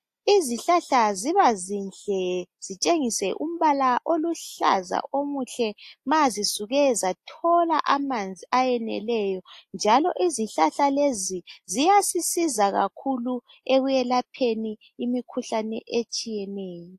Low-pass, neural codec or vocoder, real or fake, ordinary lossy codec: 14.4 kHz; none; real; AAC, 64 kbps